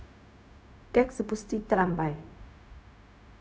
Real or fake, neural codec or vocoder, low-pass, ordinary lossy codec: fake; codec, 16 kHz, 0.4 kbps, LongCat-Audio-Codec; none; none